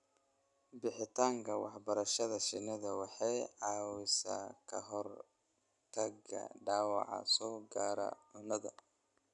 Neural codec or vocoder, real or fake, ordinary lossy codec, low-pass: none; real; none; 10.8 kHz